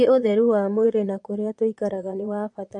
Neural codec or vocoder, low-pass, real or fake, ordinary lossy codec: vocoder, 22.05 kHz, 80 mel bands, Vocos; 9.9 kHz; fake; MP3, 48 kbps